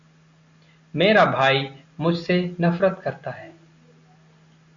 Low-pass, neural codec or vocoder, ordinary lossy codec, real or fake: 7.2 kHz; none; AAC, 64 kbps; real